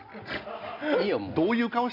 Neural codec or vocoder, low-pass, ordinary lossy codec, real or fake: none; 5.4 kHz; none; real